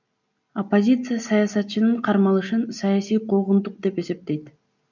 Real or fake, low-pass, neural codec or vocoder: real; 7.2 kHz; none